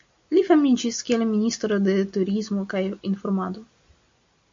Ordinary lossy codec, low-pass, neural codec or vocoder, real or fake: MP3, 48 kbps; 7.2 kHz; none; real